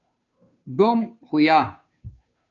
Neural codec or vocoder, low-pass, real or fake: codec, 16 kHz, 2 kbps, FunCodec, trained on Chinese and English, 25 frames a second; 7.2 kHz; fake